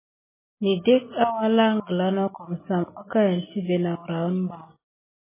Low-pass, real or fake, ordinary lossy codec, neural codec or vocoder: 3.6 kHz; real; MP3, 16 kbps; none